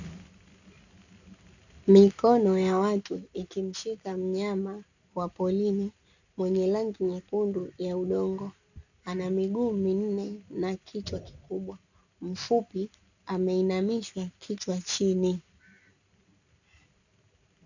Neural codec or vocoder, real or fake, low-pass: none; real; 7.2 kHz